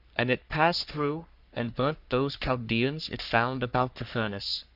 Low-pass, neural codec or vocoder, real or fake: 5.4 kHz; codec, 44.1 kHz, 3.4 kbps, Pupu-Codec; fake